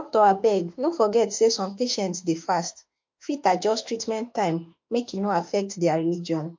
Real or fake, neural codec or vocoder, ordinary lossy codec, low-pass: fake; autoencoder, 48 kHz, 32 numbers a frame, DAC-VAE, trained on Japanese speech; MP3, 48 kbps; 7.2 kHz